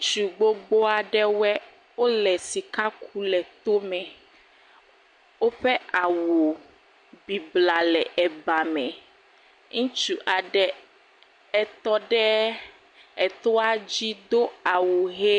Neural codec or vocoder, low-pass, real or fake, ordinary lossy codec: none; 9.9 kHz; real; MP3, 64 kbps